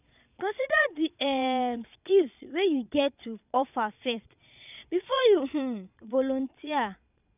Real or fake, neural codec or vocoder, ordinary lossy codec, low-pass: fake; vocoder, 22.05 kHz, 80 mel bands, Vocos; none; 3.6 kHz